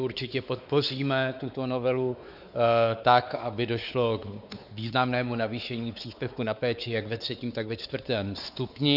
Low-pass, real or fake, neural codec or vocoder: 5.4 kHz; fake; codec, 16 kHz, 4 kbps, X-Codec, WavLM features, trained on Multilingual LibriSpeech